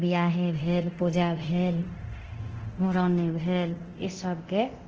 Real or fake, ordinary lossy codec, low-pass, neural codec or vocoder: fake; Opus, 16 kbps; 7.2 kHz; codec, 24 kHz, 0.9 kbps, DualCodec